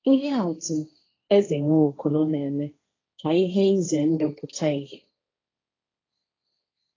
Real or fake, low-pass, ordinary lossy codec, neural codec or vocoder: fake; 7.2 kHz; AAC, 32 kbps; codec, 16 kHz, 1.1 kbps, Voila-Tokenizer